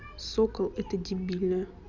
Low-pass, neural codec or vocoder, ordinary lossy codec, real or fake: 7.2 kHz; none; none; real